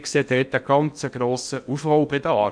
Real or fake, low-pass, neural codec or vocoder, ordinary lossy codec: fake; 9.9 kHz; codec, 16 kHz in and 24 kHz out, 0.8 kbps, FocalCodec, streaming, 65536 codes; none